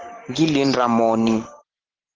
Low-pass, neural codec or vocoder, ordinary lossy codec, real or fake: 7.2 kHz; vocoder, 44.1 kHz, 128 mel bands every 512 samples, BigVGAN v2; Opus, 16 kbps; fake